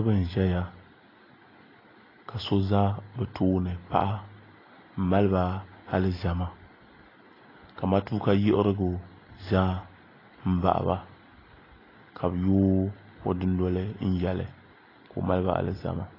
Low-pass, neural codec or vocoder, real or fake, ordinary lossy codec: 5.4 kHz; none; real; AAC, 24 kbps